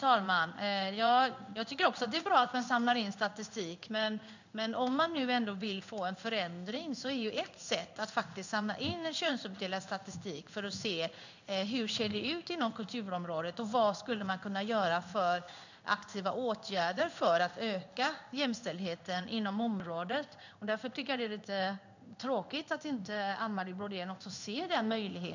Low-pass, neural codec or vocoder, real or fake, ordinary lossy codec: 7.2 kHz; codec, 16 kHz in and 24 kHz out, 1 kbps, XY-Tokenizer; fake; none